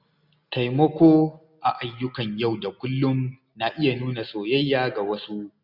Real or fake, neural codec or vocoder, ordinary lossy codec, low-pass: real; none; none; 5.4 kHz